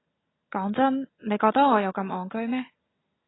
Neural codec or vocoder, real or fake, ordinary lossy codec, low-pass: none; real; AAC, 16 kbps; 7.2 kHz